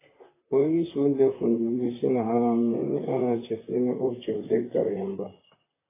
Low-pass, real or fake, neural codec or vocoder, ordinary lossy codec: 3.6 kHz; fake; vocoder, 44.1 kHz, 128 mel bands, Pupu-Vocoder; AAC, 16 kbps